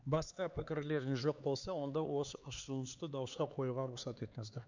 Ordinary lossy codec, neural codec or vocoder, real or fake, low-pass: Opus, 64 kbps; codec, 16 kHz, 4 kbps, X-Codec, HuBERT features, trained on LibriSpeech; fake; 7.2 kHz